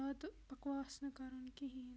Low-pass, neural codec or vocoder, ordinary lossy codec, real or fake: none; none; none; real